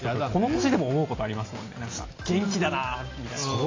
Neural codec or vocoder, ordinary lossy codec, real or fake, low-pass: none; AAC, 32 kbps; real; 7.2 kHz